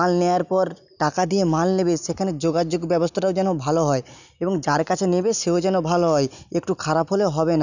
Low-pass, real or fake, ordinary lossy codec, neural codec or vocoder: 7.2 kHz; real; none; none